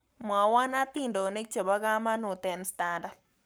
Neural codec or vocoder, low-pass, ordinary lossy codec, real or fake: codec, 44.1 kHz, 7.8 kbps, Pupu-Codec; none; none; fake